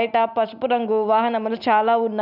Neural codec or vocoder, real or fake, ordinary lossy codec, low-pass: none; real; none; 5.4 kHz